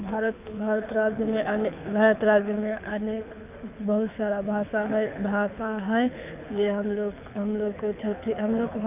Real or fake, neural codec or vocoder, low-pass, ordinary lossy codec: fake; codec, 24 kHz, 6 kbps, HILCodec; 3.6 kHz; none